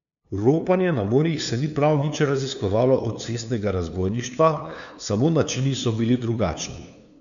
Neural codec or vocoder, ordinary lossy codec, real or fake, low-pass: codec, 16 kHz, 2 kbps, FunCodec, trained on LibriTTS, 25 frames a second; none; fake; 7.2 kHz